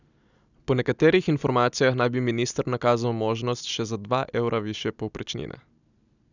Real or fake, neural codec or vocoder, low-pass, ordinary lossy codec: real; none; 7.2 kHz; none